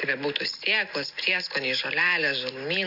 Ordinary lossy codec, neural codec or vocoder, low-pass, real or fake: AAC, 48 kbps; none; 5.4 kHz; real